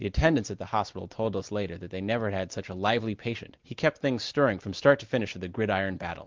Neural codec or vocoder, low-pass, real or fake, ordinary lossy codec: none; 7.2 kHz; real; Opus, 32 kbps